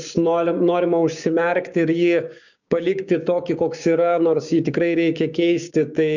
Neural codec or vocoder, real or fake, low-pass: codec, 16 kHz, 6 kbps, DAC; fake; 7.2 kHz